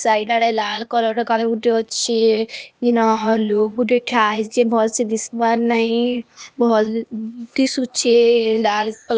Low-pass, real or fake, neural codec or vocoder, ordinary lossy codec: none; fake; codec, 16 kHz, 0.8 kbps, ZipCodec; none